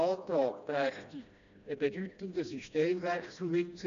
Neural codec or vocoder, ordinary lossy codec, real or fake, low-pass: codec, 16 kHz, 1 kbps, FreqCodec, smaller model; MP3, 64 kbps; fake; 7.2 kHz